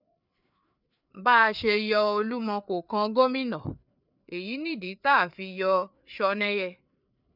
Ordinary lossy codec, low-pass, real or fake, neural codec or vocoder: none; 5.4 kHz; fake; codec, 16 kHz, 4 kbps, FreqCodec, larger model